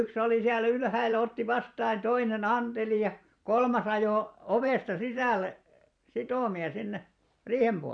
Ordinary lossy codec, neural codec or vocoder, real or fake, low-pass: none; vocoder, 44.1 kHz, 128 mel bands every 512 samples, BigVGAN v2; fake; 9.9 kHz